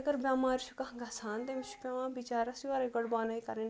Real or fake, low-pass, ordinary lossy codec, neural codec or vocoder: real; none; none; none